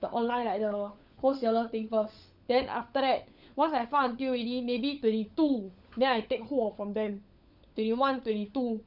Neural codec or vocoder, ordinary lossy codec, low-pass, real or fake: codec, 16 kHz, 16 kbps, FunCodec, trained on LibriTTS, 50 frames a second; none; 5.4 kHz; fake